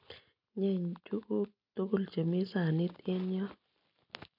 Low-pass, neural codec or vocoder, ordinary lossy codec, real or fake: 5.4 kHz; none; none; real